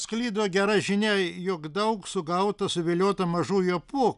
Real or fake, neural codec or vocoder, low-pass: real; none; 10.8 kHz